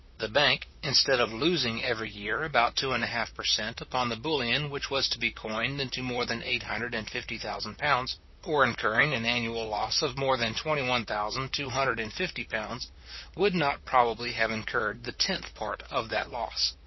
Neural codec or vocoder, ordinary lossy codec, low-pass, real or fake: vocoder, 44.1 kHz, 128 mel bands, Pupu-Vocoder; MP3, 24 kbps; 7.2 kHz; fake